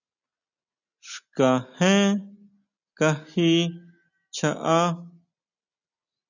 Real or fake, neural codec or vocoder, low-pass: real; none; 7.2 kHz